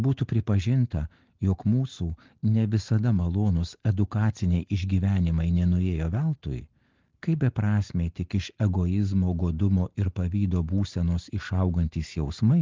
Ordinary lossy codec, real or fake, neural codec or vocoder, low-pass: Opus, 16 kbps; real; none; 7.2 kHz